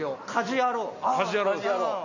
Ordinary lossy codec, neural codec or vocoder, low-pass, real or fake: none; none; 7.2 kHz; real